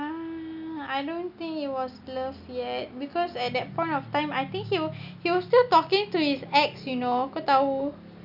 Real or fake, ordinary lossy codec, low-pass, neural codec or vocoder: real; none; 5.4 kHz; none